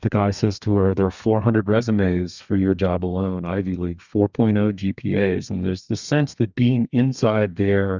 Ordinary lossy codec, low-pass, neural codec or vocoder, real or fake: Opus, 64 kbps; 7.2 kHz; codec, 32 kHz, 1.9 kbps, SNAC; fake